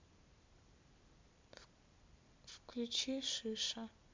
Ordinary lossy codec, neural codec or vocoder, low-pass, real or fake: MP3, 64 kbps; vocoder, 44.1 kHz, 128 mel bands every 256 samples, BigVGAN v2; 7.2 kHz; fake